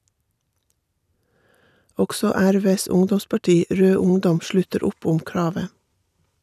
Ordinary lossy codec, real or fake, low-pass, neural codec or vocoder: none; real; 14.4 kHz; none